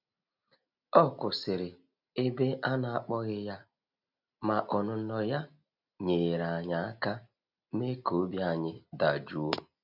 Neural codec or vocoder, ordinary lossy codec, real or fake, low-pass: none; none; real; 5.4 kHz